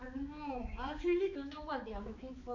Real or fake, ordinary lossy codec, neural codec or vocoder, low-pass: fake; MP3, 64 kbps; codec, 16 kHz, 4 kbps, X-Codec, HuBERT features, trained on balanced general audio; 7.2 kHz